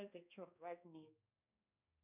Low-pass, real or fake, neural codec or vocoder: 3.6 kHz; fake; codec, 16 kHz, 1 kbps, X-Codec, HuBERT features, trained on balanced general audio